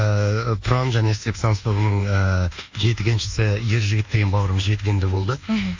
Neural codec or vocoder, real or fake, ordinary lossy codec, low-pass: codec, 24 kHz, 1.2 kbps, DualCodec; fake; AAC, 48 kbps; 7.2 kHz